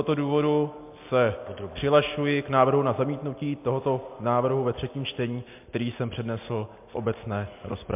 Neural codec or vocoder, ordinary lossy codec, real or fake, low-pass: none; MP3, 32 kbps; real; 3.6 kHz